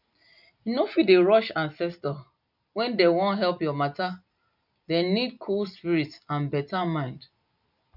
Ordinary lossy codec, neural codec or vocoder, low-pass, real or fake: none; none; 5.4 kHz; real